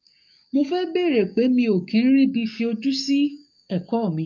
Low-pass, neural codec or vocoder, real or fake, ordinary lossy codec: 7.2 kHz; codec, 44.1 kHz, 7.8 kbps, DAC; fake; MP3, 48 kbps